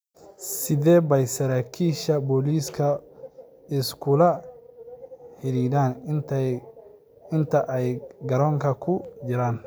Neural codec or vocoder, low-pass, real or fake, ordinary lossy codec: none; none; real; none